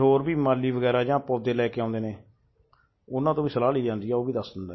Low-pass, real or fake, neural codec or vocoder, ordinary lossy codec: 7.2 kHz; real; none; MP3, 24 kbps